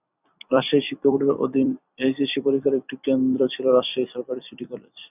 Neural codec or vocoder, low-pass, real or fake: vocoder, 44.1 kHz, 128 mel bands every 256 samples, BigVGAN v2; 3.6 kHz; fake